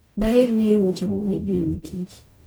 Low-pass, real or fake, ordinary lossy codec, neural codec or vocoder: none; fake; none; codec, 44.1 kHz, 0.9 kbps, DAC